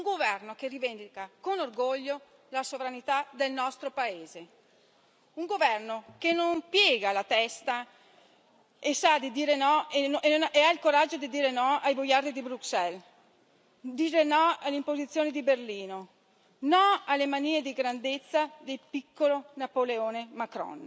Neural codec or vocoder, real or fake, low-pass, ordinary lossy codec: none; real; none; none